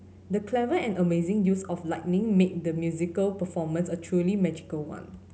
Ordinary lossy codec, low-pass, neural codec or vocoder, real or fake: none; none; none; real